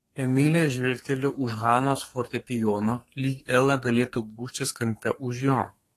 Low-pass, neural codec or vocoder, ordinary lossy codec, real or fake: 14.4 kHz; codec, 32 kHz, 1.9 kbps, SNAC; AAC, 48 kbps; fake